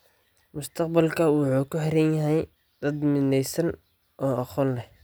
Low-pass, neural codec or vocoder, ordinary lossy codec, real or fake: none; none; none; real